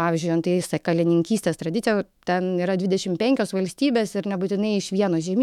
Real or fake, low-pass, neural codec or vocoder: fake; 19.8 kHz; autoencoder, 48 kHz, 128 numbers a frame, DAC-VAE, trained on Japanese speech